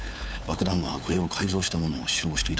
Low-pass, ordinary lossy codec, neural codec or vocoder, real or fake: none; none; codec, 16 kHz, 4 kbps, FunCodec, trained on LibriTTS, 50 frames a second; fake